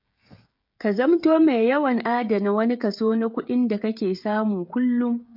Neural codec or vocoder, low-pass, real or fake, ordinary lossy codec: codec, 16 kHz, 16 kbps, FreqCodec, smaller model; 5.4 kHz; fake; MP3, 48 kbps